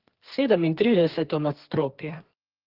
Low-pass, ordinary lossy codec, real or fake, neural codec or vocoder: 5.4 kHz; Opus, 16 kbps; fake; codec, 32 kHz, 1.9 kbps, SNAC